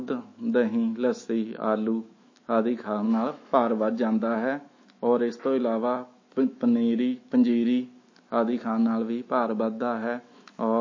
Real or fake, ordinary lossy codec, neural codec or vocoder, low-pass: fake; MP3, 32 kbps; autoencoder, 48 kHz, 128 numbers a frame, DAC-VAE, trained on Japanese speech; 7.2 kHz